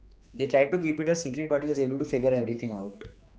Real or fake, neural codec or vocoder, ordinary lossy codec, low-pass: fake; codec, 16 kHz, 2 kbps, X-Codec, HuBERT features, trained on general audio; none; none